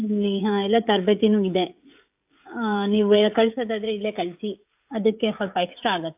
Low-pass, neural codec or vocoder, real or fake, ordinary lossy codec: 3.6 kHz; codec, 16 kHz, 16 kbps, FreqCodec, smaller model; fake; AAC, 32 kbps